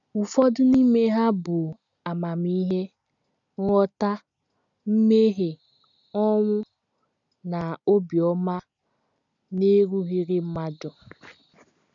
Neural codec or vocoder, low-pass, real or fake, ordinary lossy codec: none; 7.2 kHz; real; MP3, 96 kbps